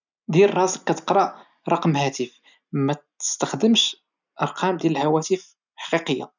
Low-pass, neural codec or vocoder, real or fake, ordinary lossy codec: 7.2 kHz; none; real; none